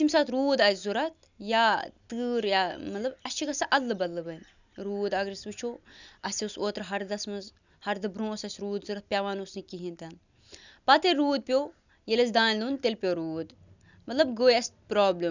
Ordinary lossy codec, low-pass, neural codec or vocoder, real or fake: none; 7.2 kHz; none; real